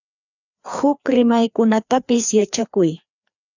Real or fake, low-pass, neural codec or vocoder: fake; 7.2 kHz; codec, 16 kHz, 2 kbps, FreqCodec, larger model